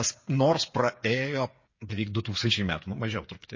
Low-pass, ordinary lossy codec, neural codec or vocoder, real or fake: 7.2 kHz; MP3, 32 kbps; codec, 16 kHz, 8 kbps, FreqCodec, smaller model; fake